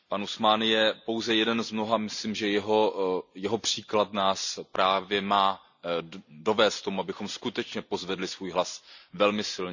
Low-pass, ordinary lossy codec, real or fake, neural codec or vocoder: 7.2 kHz; none; real; none